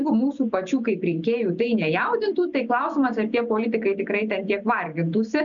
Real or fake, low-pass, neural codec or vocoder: real; 7.2 kHz; none